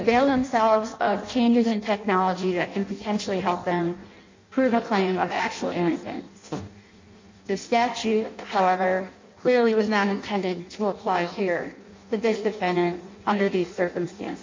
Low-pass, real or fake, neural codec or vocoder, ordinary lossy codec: 7.2 kHz; fake; codec, 16 kHz in and 24 kHz out, 0.6 kbps, FireRedTTS-2 codec; MP3, 48 kbps